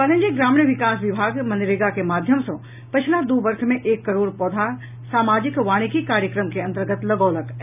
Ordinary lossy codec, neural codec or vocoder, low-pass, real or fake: none; none; 3.6 kHz; real